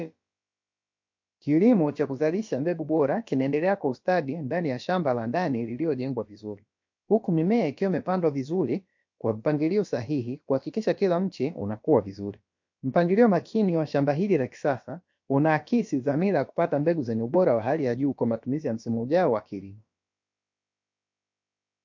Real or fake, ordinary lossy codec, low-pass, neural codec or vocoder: fake; MP3, 48 kbps; 7.2 kHz; codec, 16 kHz, about 1 kbps, DyCAST, with the encoder's durations